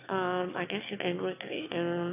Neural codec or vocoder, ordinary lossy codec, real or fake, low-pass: autoencoder, 22.05 kHz, a latent of 192 numbers a frame, VITS, trained on one speaker; none; fake; 3.6 kHz